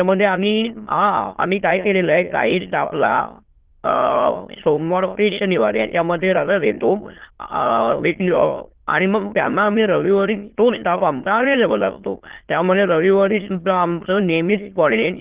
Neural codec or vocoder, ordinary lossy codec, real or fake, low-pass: autoencoder, 22.05 kHz, a latent of 192 numbers a frame, VITS, trained on many speakers; Opus, 16 kbps; fake; 3.6 kHz